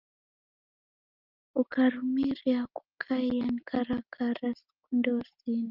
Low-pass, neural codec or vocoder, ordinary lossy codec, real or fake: 5.4 kHz; none; Opus, 32 kbps; real